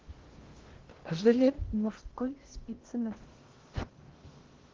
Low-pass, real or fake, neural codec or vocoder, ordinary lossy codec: 7.2 kHz; fake; codec, 16 kHz in and 24 kHz out, 0.6 kbps, FocalCodec, streaming, 2048 codes; Opus, 16 kbps